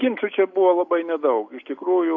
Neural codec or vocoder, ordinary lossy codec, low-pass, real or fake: none; AAC, 48 kbps; 7.2 kHz; real